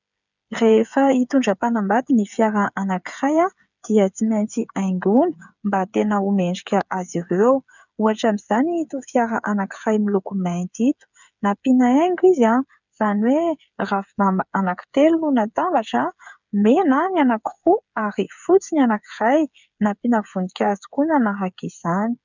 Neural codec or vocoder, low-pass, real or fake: codec, 16 kHz, 8 kbps, FreqCodec, smaller model; 7.2 kHz; fake